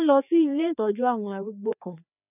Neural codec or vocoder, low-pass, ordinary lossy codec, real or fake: codec, 16 kHz, 2 kbps, FreqCodec, larger model; 3.6 kHz; none; fake